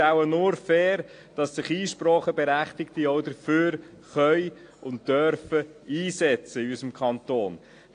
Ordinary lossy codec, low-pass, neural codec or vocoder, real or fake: AAC, 48 kbps; 9.9 kHz; none; real